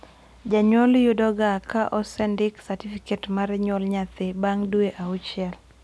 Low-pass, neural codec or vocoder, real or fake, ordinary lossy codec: none; none; real; none